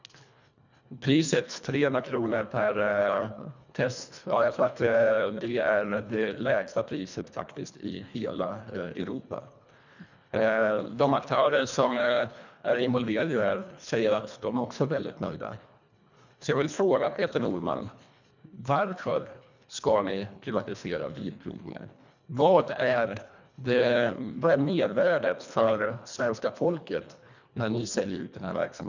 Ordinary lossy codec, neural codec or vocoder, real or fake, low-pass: none; codec, 24 kHz, 1.5 kbps, HILCodec; fake; 7.2 kHz